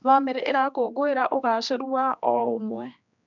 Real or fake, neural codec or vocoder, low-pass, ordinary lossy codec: fake; codec, 16 kHz, 2 kbps, X-Codec, HuBERT features, trained on general audio; 7.2 kHz; none